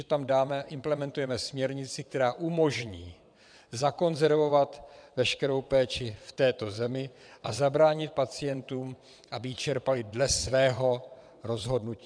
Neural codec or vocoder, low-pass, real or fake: vocoder, 22.05 kHz, 80 mel bands, WaveNeXt; 9.9 kHz; fake